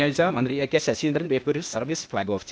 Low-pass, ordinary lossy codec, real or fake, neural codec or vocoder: none; none; fake; codec, 16 kHz, 0.8 kbps, ZipCodec